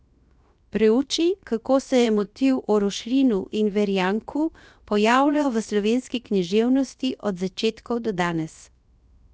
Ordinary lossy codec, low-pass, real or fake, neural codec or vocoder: none; none; fake; codec, 16 kHz, 0.7 kbps, FocalCodec